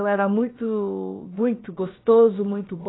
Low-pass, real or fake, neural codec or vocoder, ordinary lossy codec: 7.2 kHz; fake; codec, 16 kHz, about 1 kbps, DyCAST, with the encoder's durations; AAC, 16 kbps